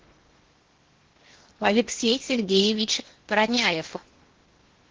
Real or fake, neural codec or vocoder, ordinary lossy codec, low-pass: fake; codec, 16 kHz in and 24 kHz out, 0.8 kbps, FocalCodec, streaming, 65536 codes; Opus, 16 kbps; 7.2 kHz